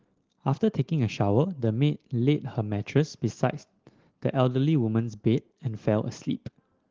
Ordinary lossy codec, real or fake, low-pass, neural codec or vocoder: Opus, 16 kbps; real; 7.2 kHz; none